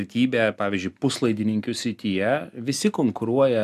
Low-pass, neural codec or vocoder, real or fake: 14.4 kHz; none; real